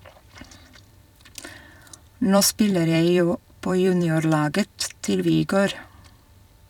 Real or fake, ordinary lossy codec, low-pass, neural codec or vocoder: fake; none; 19.8 kHz; vocoder, 44.1 kHz, 128 mel bands every 256 samples, BigVGAN v2